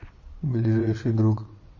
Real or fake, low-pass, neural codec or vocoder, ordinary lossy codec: fake; 7.2 kHz; vocoder, 22.05 kHz, 80 mel bands, WaveNeXt; MP3, 32 kbps